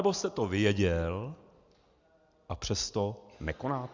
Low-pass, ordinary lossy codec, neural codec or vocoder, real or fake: 7.2 kHz; Opus, 64 kbps; none; real